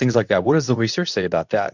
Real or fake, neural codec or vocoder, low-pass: fake; codec, 24 kHz, 0.9 kbps, WavTokenizer, medium speech release version 2; 7.2 kHz